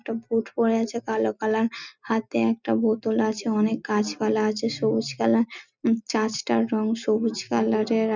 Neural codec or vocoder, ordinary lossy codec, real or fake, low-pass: none; none; real; 7.2 kHz